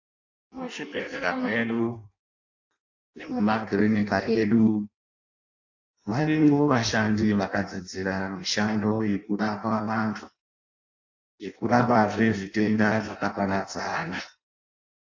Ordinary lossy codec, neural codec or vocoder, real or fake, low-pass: AAC, 48 kbps; codec, 16 kHz in and 24 kHz out, 0.6 kbps, FireRedTTS-2 codec; fake; 7.2 kHz